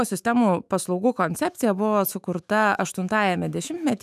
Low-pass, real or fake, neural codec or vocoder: 14.4 kHz; fake; autoencoder, 48 kHz, 128 numbers a frame, DAC-VAE, trained on Japanese speech